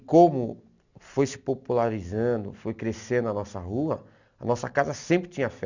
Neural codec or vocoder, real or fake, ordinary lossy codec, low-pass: none; real; none; 7.2 kHz